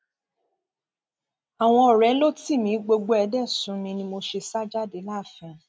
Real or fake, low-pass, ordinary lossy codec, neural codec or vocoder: real; none; none; none